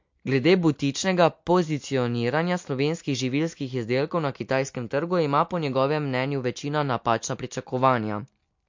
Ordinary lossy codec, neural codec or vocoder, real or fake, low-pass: MP3, 48 kbps; none; real; 7.2 kHz